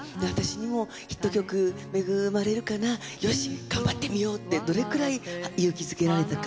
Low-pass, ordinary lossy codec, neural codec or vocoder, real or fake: none; none; none; real